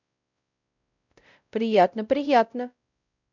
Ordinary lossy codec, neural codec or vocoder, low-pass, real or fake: none; codec, 16 kHz, 0.5 kbps, X-Codec, WavLM features, trained on Multilingual LibriSpeech; 7.2 kHz; fake